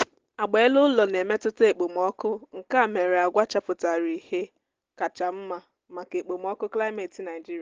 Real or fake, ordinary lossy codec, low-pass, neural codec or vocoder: real; Opus, 16 kbps; 7.2 kHz; none